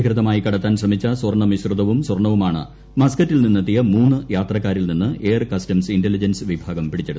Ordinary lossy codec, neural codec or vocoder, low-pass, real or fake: none; none; none; real